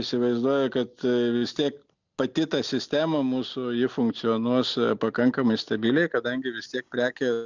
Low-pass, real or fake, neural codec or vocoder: 7.2 kHz; real; none